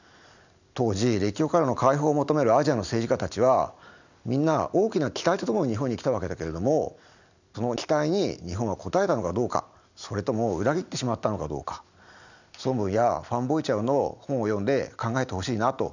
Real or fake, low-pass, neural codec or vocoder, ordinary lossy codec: real; 7.2 kHz; none; none